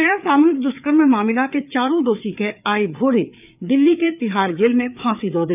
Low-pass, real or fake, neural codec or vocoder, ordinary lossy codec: 3.6 kHz; fake; codec, 16 kHz, 4 kbps, FreqCodec, larger model; none